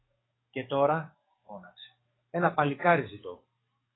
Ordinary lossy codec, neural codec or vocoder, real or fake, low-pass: AAC, 16 kbps; codec, 44.1 kHz, 7.8 kbps, DAC; fake; 7.2 kHz